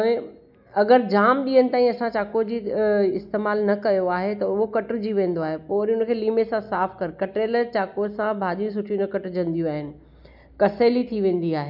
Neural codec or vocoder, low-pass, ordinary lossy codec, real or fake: none; 5.4 kHz; none; real